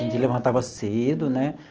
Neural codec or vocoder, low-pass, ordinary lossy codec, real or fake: none; 7.2 kHz; Opus, 16 kbps; real